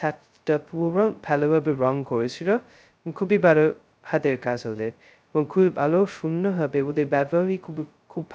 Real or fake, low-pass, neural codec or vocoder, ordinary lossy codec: fake; none; codec, 16 kHz, 0.2 kbps, FocalCodec; none